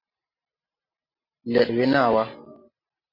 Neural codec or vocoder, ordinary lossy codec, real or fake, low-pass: none; MP3, 32 kbps; real; 5.4 kHz